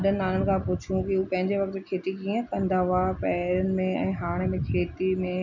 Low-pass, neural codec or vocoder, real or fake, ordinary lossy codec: 7.2 kHz; none; real; none